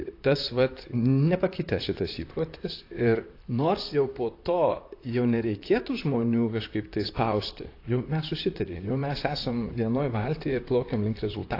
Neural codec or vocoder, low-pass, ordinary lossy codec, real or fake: vocoder, 44.1 kHz, 128 mel bands, Pupu-Vocoder; 5.4 kHz; AAC, 32 kbps; fake